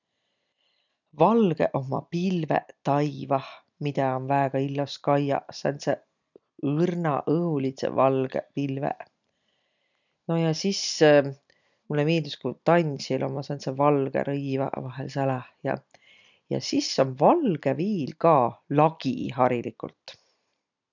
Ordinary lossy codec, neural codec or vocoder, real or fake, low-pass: none; none; real; 7.2 kHz